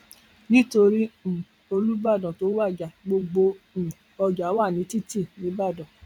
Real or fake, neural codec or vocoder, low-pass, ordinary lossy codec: fake; vocoder, 44.1 kHz, 128 mel bands every 512 samples, BigVGAN v2; 19.8 kHz; none